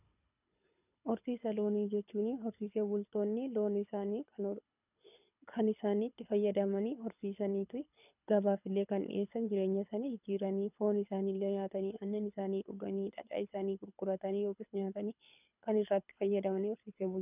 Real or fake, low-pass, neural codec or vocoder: fake; 3.6 kHz; codec, 24 kHz, 6 kbps, HILCodec